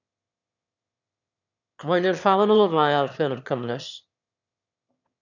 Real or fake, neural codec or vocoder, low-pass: fake; autoencoder, 22.05 kHz, a latent of 192 numbers a frame, VITS, trained on one speaker; 7.2 kHz